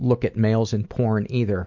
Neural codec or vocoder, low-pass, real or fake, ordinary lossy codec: none; 7.2 kHz; real; MP3, 64 kbps